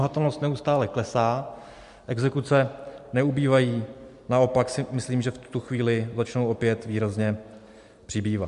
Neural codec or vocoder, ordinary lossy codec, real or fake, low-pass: none; MP3, 64 kbps; real; 10.8 kHz